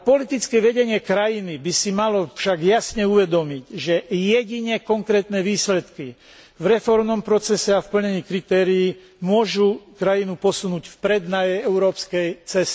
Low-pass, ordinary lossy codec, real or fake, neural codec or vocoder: none; none; real; none